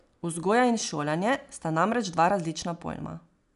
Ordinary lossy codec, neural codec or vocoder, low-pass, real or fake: none; none; 10.8 kHz; real